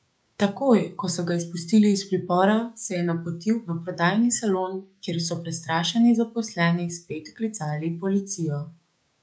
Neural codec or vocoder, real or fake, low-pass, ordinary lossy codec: codec, 16 kHz, 6 kbps, DAC; fake; none; none